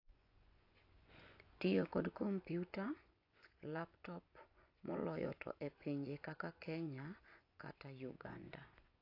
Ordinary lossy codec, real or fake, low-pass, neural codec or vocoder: AAC, 32 kbps; fake; 5.4 kHz; vocoder, 24 kHz, 100 mel bands, Vocos